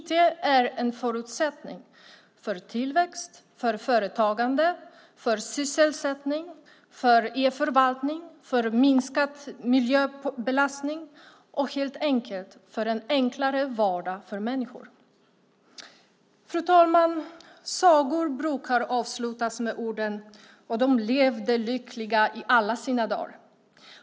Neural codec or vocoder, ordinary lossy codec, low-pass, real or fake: none; none; none; real